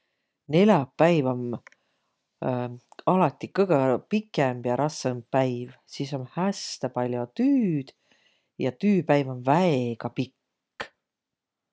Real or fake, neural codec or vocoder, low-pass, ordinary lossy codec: real; none; none; none